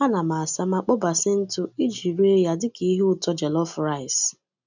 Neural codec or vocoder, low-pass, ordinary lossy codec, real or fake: none; 7.2 kHz; none; real